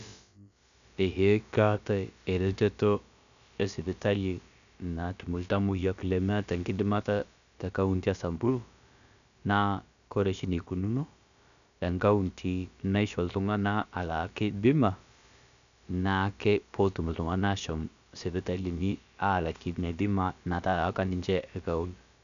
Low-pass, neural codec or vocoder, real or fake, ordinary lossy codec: 7.2 kHz; codec, 16 kHz, about 1 kbps, DyCAST, with the encoder's durations; fake; none